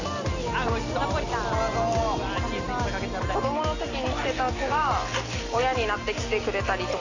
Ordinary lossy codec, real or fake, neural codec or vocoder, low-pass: Opus, 64 kbps; real; none; 7.2 kHz